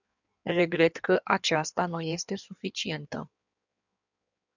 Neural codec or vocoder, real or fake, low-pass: codec, 16 kHz in and 24 kHz out, 1.1 kbps, FireRedTTS-2 codec; fake; 7.2 kHz